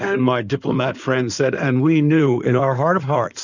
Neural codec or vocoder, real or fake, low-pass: codec, 16 kHz in and 24 kHz out, 2.2 kbps, FireRedTTS-2 codec; fake; 7.2 kHz